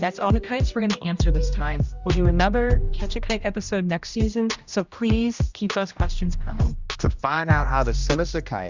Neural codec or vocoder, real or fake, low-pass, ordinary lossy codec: codec, 16 kHz, 1 kbps, X-Codec, HuBERT features, trained on general audio; fake; 7.2 kHz; Opus, 64 kbps